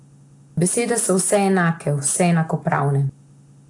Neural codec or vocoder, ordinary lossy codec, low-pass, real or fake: none; AAC, 48 kbps; 10.8 kHz; real